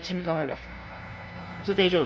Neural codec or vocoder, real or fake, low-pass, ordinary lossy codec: codec, 16 kHz, 0.5 kbps, FunCodec, trained on LibriTTS, 25 frames a second; fake; none; none